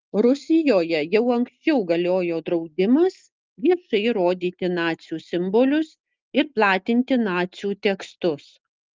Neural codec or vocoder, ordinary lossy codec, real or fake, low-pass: none; Opus, 32 kbps; real; 7.2 kHz